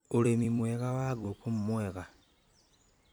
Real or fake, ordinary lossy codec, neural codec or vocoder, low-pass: fake; none; vocoder, 44.1 kHz, 128 mel bands every 256 samples, BigVGAN v2; none